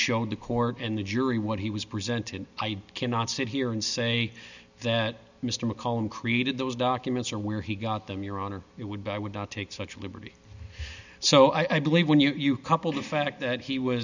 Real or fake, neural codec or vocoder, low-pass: real; none; 7.2 kHz